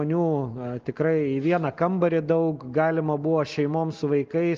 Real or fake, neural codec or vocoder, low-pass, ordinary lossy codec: real; none; 7.2 kHz; Opus, 24 kbps